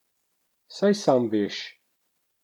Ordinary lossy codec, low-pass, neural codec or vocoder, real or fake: none; 19.8 kHz; none; real